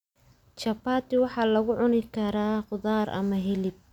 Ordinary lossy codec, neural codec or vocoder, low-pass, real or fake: none; none; 19.8 kHz; real